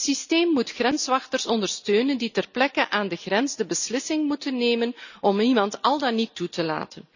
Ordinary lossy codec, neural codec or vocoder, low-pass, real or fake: none; none; 7.2 kHz; real